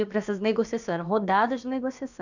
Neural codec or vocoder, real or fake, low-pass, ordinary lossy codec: codec, 16 kHz, about 1 kbps, DyCAST, with the encoder's durations; fake; 7.2 kHz; none